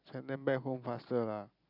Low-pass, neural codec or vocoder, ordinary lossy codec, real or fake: 5.4 kHz; none; none; real